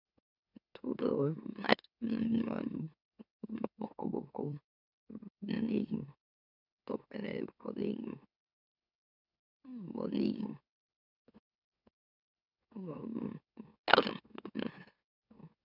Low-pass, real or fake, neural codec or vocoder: 5.4 kHz; fake; autoencoder, 44.1 kHz, a latent of 192 numbers a frame, MeloTTS